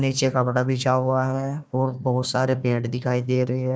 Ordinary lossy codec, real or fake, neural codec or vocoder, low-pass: none; fake; codec, 16 kHz, 1 kbps, FunCodec, trained on Chinese and English, 50 frames a second; none